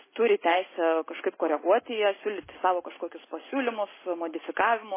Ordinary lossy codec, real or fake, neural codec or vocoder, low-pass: MP3, 16 kbps; real; none; 3.6 kHz